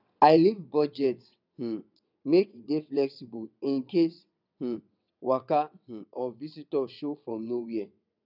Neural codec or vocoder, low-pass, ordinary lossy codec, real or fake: vocoder, 22.05 kHz, 80 mel bands, WaveNeXt; 5.4 kHz; none; fake